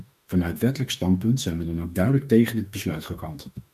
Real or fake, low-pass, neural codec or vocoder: fake; 14.4 kHz; autoencoder, 48 kHz, 32 numbers a frame, DAC-VAE, trained on Japanese speech